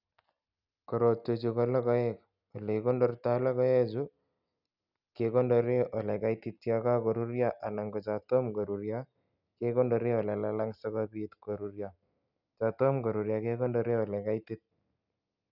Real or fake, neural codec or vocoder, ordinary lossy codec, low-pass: real; none; none; 5.4 kHz